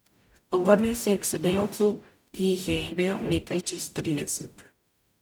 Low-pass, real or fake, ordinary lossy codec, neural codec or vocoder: none; fake; none; codec, 44.1 kHz, 0.9 kbps, DAC